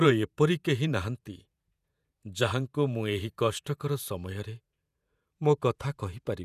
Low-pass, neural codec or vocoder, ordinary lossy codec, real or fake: 14.4 kHz; vocoder, 48 kHz, 128 mel bands, Vocos; none; fake